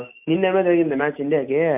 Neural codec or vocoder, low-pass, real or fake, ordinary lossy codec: none; 3.6 kHz; real; MP3, 32 kbps